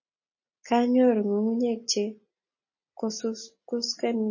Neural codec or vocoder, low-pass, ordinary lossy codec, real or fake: none; 7.2 kHz; MP3, 32 kbps; real